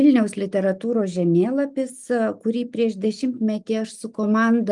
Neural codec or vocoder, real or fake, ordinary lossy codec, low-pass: none; real; Opus, 32 kbps; 10.8 kHz